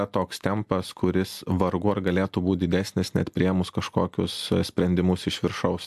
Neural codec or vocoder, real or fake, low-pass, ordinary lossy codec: none; real; 14.4 kHz; AAC, 96 kbps